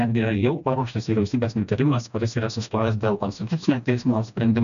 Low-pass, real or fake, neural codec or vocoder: 7.2 kHz; fake; codec, 16 kHz, 1 kbps, FreqCodec, smaller model